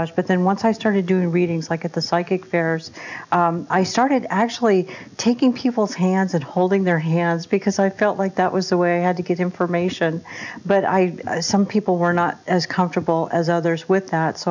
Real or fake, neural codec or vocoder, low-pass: real; none; 7.2 kHz